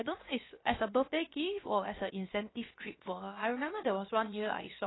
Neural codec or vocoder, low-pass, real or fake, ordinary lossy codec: codec, 16 kHz, 0.7 kbps, FocalCodec; 7.2 kHz; fake; AAC, 16 kbps